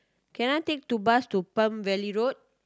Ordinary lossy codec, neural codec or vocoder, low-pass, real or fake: none; none; none; real